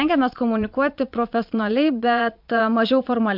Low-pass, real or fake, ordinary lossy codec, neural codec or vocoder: 5.4 kHz; fake; MP3, 48 kbps; vocoder, 22.05 kHz, 80 mel bands, Vocos